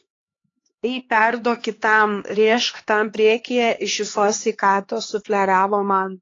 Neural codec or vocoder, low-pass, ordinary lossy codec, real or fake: codec, 16 kHz, 2 kbps, X-Codec, HuBERT features, trained on LibriSpeech; 7.2 kHz; AAC, 32 kbps; fake